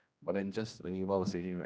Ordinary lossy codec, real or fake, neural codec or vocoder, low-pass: none; fake; codec, 16 kHz, 1 kbps, X-Codec, HuBERT features, trained on general audio; none